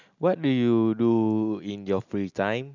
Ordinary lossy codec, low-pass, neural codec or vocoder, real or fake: none; 7.2 kHz; none; real